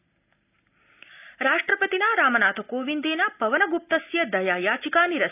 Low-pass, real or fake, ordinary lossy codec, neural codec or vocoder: 3.6 kHz; real; none; none